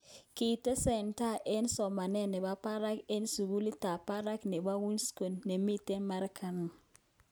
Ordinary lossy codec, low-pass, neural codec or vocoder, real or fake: none; none; none; real